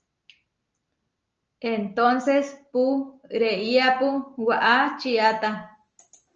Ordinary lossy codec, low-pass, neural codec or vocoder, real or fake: Opus, 24 kbps; 7.2 kHz; none; real